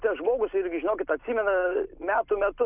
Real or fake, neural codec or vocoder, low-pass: real; none; 3.6 kHz